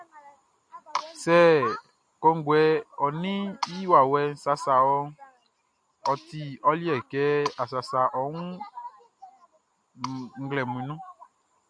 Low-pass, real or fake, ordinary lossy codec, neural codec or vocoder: 9.9 kHz; real; AAC, 64 kbps; none